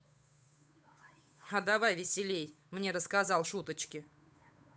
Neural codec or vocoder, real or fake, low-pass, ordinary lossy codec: codec, 16 kHz, 8 kbps, FunCodec, trained on Chinese and English, 25 frames a second; fake; none; none